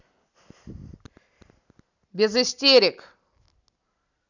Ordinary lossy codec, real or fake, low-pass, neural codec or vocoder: none; real; 7.2 kHz; none